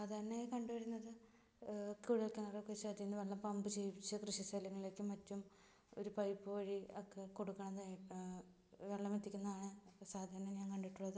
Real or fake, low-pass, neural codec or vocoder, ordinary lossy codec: real; none; none; none